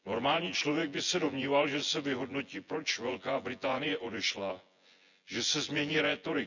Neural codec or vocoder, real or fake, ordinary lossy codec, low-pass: vocoder, 24 kHz, 100 mel bands, Vocos; fake; none; 7.2 kHz